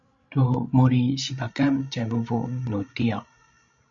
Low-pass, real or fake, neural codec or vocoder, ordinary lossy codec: 7.2 kHz; fake; codec, 16 kHz, 16 kbps, FreqCodec, larger model; MP3, 48 kbps